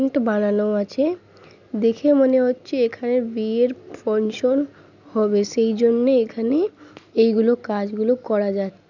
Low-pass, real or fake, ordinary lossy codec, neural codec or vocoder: 7.2 kHz; real; none; none